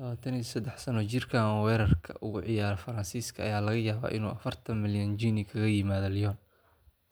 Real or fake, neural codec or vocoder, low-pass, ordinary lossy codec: real; none; none; none